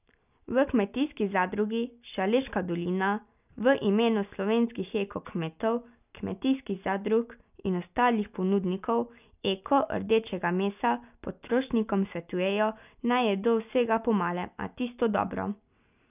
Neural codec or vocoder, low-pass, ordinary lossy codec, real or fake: none; 3.6 kHz; none; real